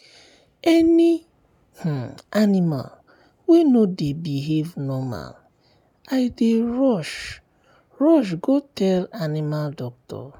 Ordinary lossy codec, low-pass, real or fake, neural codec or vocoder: none; 19.8 kHz; real; none